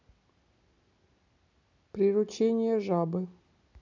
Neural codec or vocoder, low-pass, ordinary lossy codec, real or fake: none; 7.2 kHz; none; real